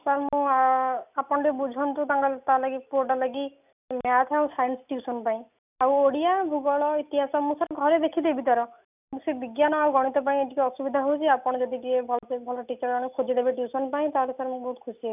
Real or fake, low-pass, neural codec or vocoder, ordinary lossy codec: real; 3.6 kHz; none; none